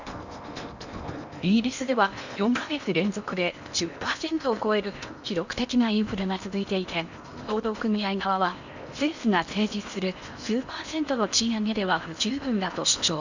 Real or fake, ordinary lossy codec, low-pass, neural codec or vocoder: fake; none; 7.2 kHz; codec, 16 kHz in and 24 kHz out, 0.8 kbps, FocalCodec, streaming, 65536 codes